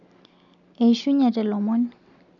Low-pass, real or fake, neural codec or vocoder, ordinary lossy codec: 7.2 kHz; real; none; none